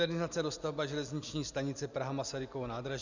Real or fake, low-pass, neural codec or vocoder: real; 7.2 kHz; none